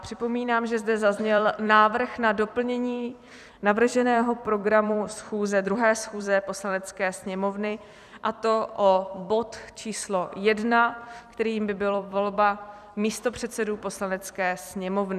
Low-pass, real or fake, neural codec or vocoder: 14.4 kHz; fake; vocoder, 44.1 kHz, 128 mel bands every 256 samples, BigVGAN v2